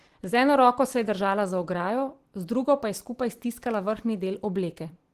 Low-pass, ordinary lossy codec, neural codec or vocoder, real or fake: 14.4 kHz; Opus, 16 kbps; none; real